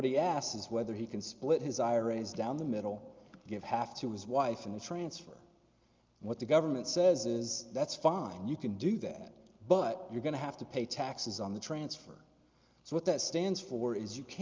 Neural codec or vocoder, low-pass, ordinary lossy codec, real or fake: none; 7.2 kHz; Opus, 24 kbps; real